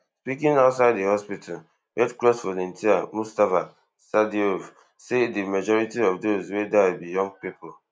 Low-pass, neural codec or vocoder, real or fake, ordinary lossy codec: none; none; real; none